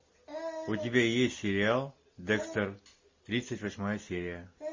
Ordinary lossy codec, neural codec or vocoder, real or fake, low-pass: MP3, 32 kbps; none; real; 7.2 kHz